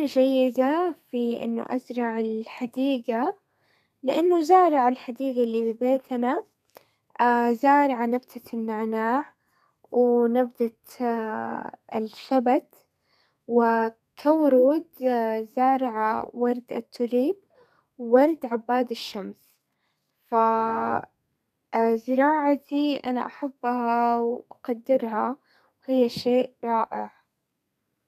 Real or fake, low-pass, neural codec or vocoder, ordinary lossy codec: fake; 14.4 kHz; codec, 32 kHz, 1.9 kbps, SNAC; none